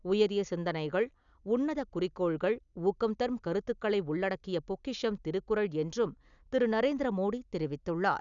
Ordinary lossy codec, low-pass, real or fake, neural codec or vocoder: none; 7.2 kHz; real; none